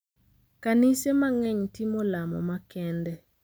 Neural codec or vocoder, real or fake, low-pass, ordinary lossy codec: none; real; none; none